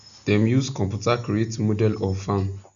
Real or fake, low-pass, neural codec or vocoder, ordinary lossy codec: real; 7.2 kHz; none; none